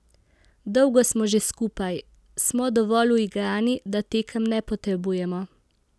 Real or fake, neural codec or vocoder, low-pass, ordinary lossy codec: real; none; none; none